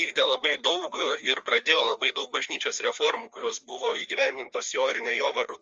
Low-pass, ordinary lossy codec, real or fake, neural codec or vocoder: 7.2 kHz; Opus, 32 kbps; fake; codec, 16 kHz, 2 kbps, FreqCodec, larger model